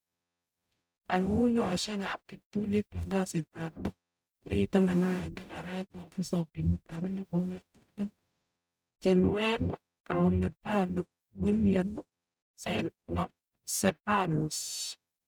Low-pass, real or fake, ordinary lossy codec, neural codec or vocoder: none; fake; none; codec, 44.1 kHz, 0.9 kbps, DAC